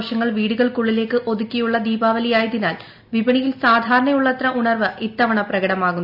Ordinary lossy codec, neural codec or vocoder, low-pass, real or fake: none; none; 5.4 kHz; real